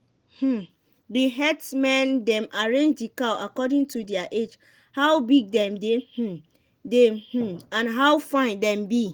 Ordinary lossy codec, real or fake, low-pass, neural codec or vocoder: Opus, 24 kbps; real; 19.8 kHz; none